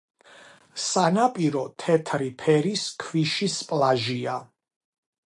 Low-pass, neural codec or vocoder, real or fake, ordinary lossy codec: 10.8 kHz; none; real; AAC, 64 kbps